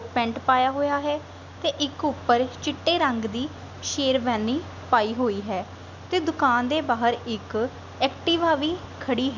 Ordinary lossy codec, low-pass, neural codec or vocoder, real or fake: none; 7.2 kHz; none; real